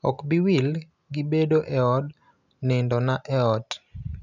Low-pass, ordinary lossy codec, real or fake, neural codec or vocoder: 7.2 kHz; none; real; none